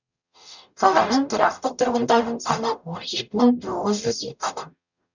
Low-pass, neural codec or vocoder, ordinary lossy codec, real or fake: 7.2 kHz; codec, 44.1 kHz, 0.9 kbps, DAC; none; fake